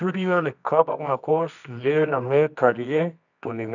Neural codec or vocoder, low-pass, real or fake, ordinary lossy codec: codec, 24 kHz, 0.9 kbps, WavTokenizer, medium music audio release; 7.2 kHz; fake; none